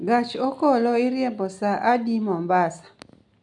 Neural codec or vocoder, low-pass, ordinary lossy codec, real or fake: none; 10.8 kHz; none; real